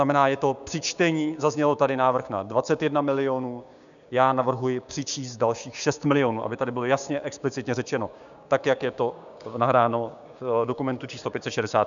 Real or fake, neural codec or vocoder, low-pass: fake; codec, 16 kHz, 6 kbps, DAC; 7.2 kHz